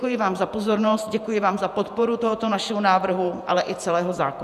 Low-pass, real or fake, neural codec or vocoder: 14.4 kHz; fake; vocoder, 48 kHz, 128 mel bands, Vocos